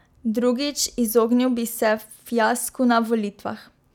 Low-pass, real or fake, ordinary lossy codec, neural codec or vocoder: 19.8 kHz; real; none; none